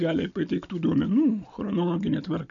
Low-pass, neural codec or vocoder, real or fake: 7.2 kHz; none; real